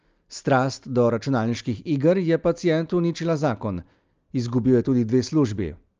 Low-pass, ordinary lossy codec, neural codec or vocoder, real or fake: 7.2 kHz; Opus, 24 kbps; none; real